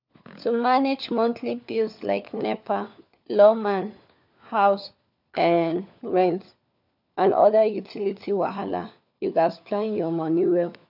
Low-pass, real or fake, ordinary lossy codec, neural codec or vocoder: 5.4 kHz; fake; none; codec, 16 kHz, 4 kbps, FunCodec, trained on LibriTTS, 50 frames a second